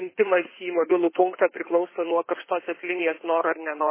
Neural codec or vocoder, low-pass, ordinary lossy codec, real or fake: codec, 16 kHz in and 24 kHz out, 2.2 kbps, FireRedTTS-2 codec; 3.6 kHz; MP3, 16 kbps; fake